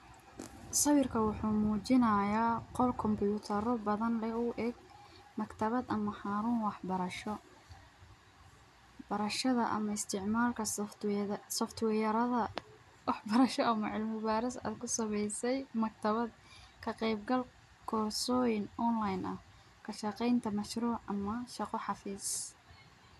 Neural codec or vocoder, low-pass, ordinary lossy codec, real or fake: none; 14.4 kHz; none; real